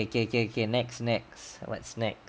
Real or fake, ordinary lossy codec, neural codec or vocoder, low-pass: real; none; none; none